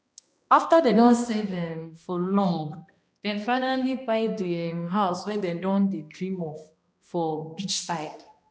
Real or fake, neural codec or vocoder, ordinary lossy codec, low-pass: fake; codec, 16 kHz, 1 kbps, X-Codec, HuBERT features, trained on balanced general audio; none; none